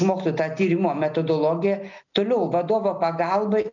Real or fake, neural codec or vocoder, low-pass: real; none; 7.2 kHz